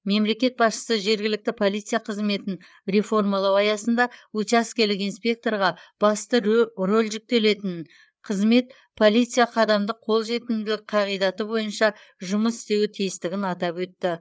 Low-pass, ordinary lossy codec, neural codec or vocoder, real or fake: none; none; codec, 16 kHz, 4 kbps, FreqCodec, larger model; fake